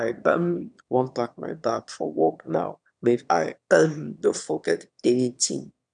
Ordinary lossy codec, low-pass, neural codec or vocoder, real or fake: none; 9.9 kHz; autoencoder, 22.05 kHz, a latent of 192 numbers a frame, VITS, trained on one speaker; fake